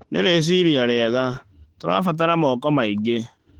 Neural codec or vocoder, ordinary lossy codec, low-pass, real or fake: autoencoder, 48 kHz, 32 numbers a frame, DAC-VAE, trained on Japanese speech; Opus, 16 kbps; 19.8 kHz; fake